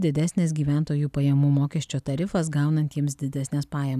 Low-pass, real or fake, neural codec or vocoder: 14.4 kHz; real; none